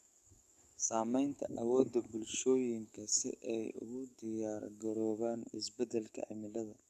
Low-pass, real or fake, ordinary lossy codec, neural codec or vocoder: 14.4 kHz; fake; none; codec, 44.1 kHz, 7.8 kbps, DAC